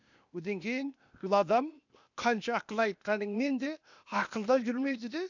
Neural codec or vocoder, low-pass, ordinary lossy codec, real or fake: codec, 16 kHz, 0.8 kbps, ZipCodec; 7.2 kHz; none; fake